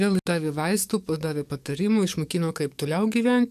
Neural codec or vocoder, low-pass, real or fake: codec, 44.1 kHz, 7.8 kbps, DAC; 14.4 kHz; fake